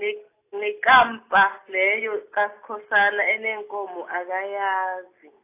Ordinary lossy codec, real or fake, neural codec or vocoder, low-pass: AAC, 24 kbps; real; none; 3.6 kHz